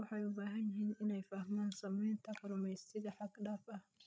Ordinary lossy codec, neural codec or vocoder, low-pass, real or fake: none; codec, 16 kHz, 16 kbps, FreqCodec, larger model; none; fake